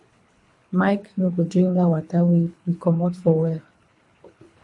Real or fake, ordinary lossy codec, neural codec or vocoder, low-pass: fake; MP3, 48 kbps; codec, 24 kHz, 3 kbps, HILCodec; 10.8 kHz